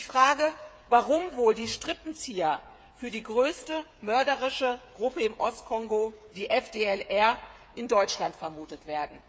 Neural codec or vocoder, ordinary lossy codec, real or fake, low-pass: codec, 16 kHz, 8 kbps, FreqCodec, smaller model; none; fake; none